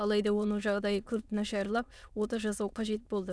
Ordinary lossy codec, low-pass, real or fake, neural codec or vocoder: none; none; fake; autoencoder, 22.05 kHz, a latent of 192 numbers a frame, VITS, trained on many speakers